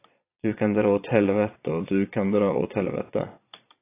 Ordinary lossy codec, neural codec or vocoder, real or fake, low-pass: AAC, 24 kbps; vocoder, 22.05 kHz, 80 mel bands, Vocos; fake; 3.6 kHz